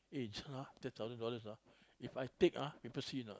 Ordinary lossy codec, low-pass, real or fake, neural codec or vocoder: none; none; real; none